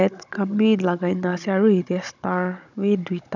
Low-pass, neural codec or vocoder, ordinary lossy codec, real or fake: 7.2 kHz; codec, 16 kHz, 16 kbps, FunCodec, trained on Chinese and English, 50 frames a second; none; fake